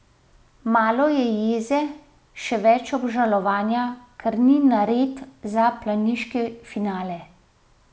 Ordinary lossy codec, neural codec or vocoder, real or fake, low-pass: none; none; real; none